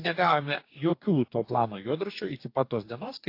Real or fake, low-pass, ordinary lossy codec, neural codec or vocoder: fake; 5.4 kHz; AAC, 32 kbps; codec, 44.1 kHz, 2.6 kbps, DAC